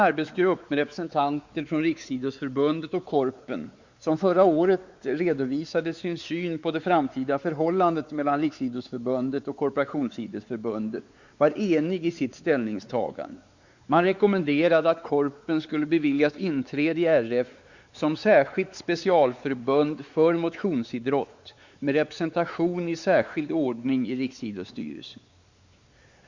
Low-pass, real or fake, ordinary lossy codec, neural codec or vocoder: 7.2 kHz; fake; Opus, 64 kbps; codec, 16 kHz, 4 kbps, X-Codec, WavLM features, trained on Multilingual LibriSpeech